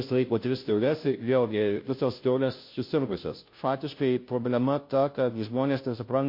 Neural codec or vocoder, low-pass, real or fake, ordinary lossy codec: codec, 16 kHz, 0.5 kbps, FunCodec, trained on Chinese and English, 25 frames a second; 5.4 kHz; fake; MP3, 32 kbps